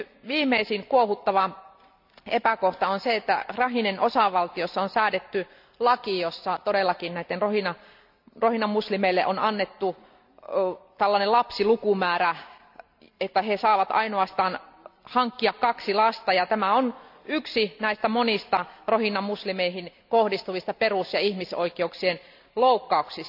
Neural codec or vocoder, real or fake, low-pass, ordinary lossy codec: none; real; 5.4 kHz; none